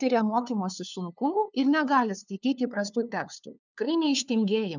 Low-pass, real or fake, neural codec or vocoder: 7.2 kHz; fake; codec, 16 kHz, 2 kbps, FunCodec, trained on LibriTTS, 25 frames a second